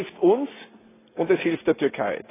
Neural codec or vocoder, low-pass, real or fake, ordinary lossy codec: none; 3.6 kHz; real; AAC, 16 kbps